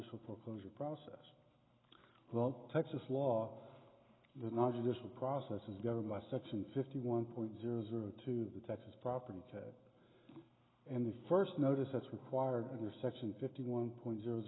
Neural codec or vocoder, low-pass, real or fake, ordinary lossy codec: none; 7.2 kHz; real; AAC, 16 kbps